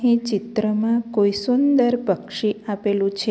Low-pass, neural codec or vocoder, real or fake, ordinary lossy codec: none; none; real; none